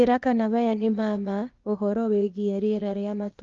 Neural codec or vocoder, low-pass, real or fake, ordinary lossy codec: codec, 16 kHz, 0.8 kbps, ZipCodec; 7.2 kHz; fake; Opus, 32 kbps